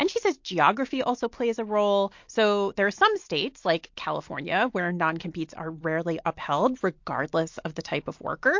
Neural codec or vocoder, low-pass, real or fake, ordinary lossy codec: none; 7.2 kHz; real; MP3, 48 kbps